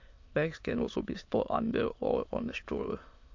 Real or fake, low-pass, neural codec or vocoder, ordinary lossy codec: fake; 7.2 kHz; autoencoder, 22.05 kHz, a latent of 192 numbers a frame, VITS, trained on many speakers; MP3, 48 kbps